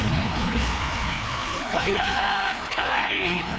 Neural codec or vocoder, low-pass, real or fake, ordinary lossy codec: codec, 16 kHz, 2 kbps, FreqCodec, larger model; none; fake; none